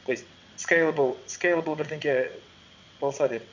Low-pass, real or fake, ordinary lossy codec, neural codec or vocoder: 7.2 kHz; fake; MP3, 64 kbps; vocoder, 22.05 kHz, 80 mel bands, WaveNeXt